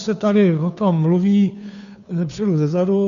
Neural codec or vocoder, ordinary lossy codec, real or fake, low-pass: codec, 16 kHz, 2 kbps, FunCodec, trained on Chinese and English, 25 frames a second; AAC, 96 kbps; fake; 7.2 kHz